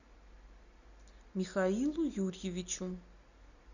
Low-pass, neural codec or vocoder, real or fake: 7.2 kHz; none; real